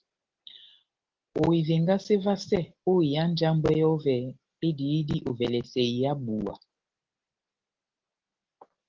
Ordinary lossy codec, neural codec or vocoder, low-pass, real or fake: Opus, 16 kbps; none; 7.2 kHz; real